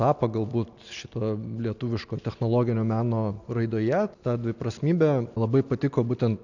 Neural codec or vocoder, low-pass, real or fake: none; 7.2 kHz; real